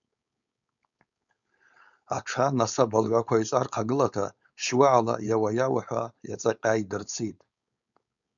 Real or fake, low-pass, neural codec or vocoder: fake; 7.2 kHz; codec, 16 kHz, 4.8 kbps, FACodec